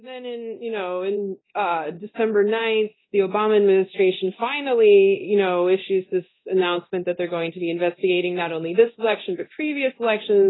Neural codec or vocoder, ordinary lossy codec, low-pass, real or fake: codec, 24 kHz, 0.9 kbps, DualCodec; AAC, 16 kbps; 7.2 kHz; fake